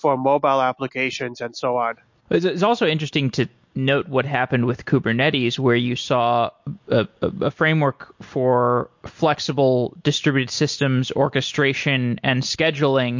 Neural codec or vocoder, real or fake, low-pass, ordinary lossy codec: none; real; 7.2 kHz; MP3, 48 kbps